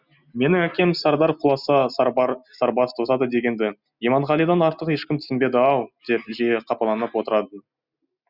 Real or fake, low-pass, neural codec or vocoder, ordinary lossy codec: real; 5.4 kHz; none; Opus, 64 kbps